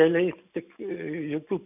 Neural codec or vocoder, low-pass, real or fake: none; 3.6 kHz; real